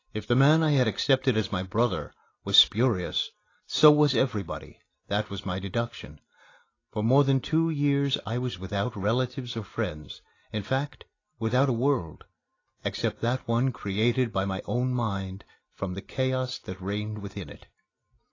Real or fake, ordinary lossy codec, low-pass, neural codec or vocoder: real; AAC, 32 kbps; 7.2 kHz; none